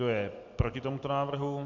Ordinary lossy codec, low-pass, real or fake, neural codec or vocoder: MP3, 64 kbps; 7.2 kHz; real; none